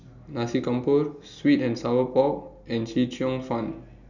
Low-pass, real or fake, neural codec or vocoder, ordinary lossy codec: 7.2 kHz; real; none; none